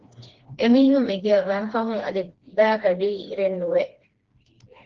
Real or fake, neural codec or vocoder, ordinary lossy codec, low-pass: fake; codec, 16 kHz, 2 kbps, FreqCodec, smaller model; Opus, 16 kbps; 7.2 kHz